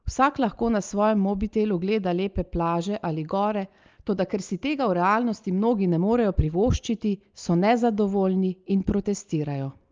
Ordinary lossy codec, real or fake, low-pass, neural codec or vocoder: Opus, 24 kbps; real; 7.2 kHz; none